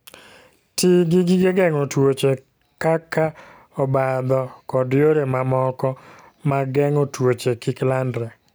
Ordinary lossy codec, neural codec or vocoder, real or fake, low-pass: none; none; real; none